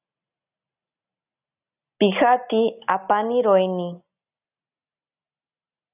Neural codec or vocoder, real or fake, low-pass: none; real; 3.6 kHz